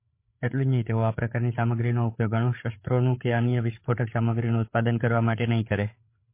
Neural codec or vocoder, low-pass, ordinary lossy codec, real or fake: codec, 16 kHz, 8 kbps, FreqCodec, larger model; 3.6 kHz; MP3, 24 kbps; fake